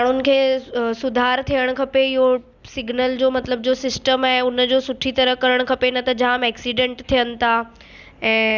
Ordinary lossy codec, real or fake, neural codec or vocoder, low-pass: Opus, 64 kbps; real; none; 7.2 kHz